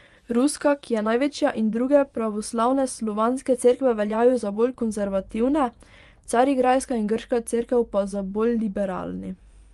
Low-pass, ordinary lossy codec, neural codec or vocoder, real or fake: 10.8 kHz; Opus, 32 kbps; vocoder, 24 kHz, 100 mel bands, Vocos; fake